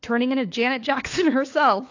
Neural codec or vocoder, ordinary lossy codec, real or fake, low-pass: codec, 16 kHz, 4 kbps, FunCodec, trained on LibriTTS, 50 frames a second; AAC, 48 kbps; fake; 7.2 kHz